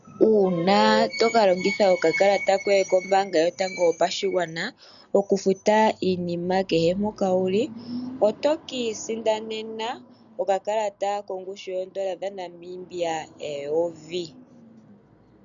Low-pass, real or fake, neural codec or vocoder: 7.2 kHz; real; none